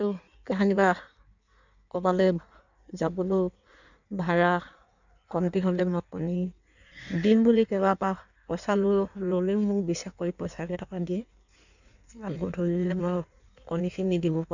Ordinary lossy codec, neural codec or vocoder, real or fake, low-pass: none; codec, 16 kHz in and 24 kHz out, 1.1 kbps, FireRedTTS-2 codec; fake; 7.2 kHz